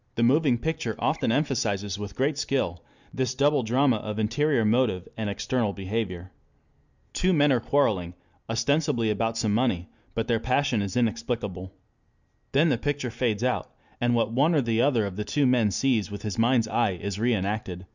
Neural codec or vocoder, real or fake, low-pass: none; real; 7.2 kHz